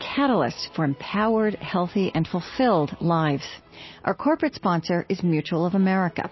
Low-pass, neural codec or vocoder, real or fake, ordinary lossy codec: 7.2 kHz; none; real; MP3, 24 kbps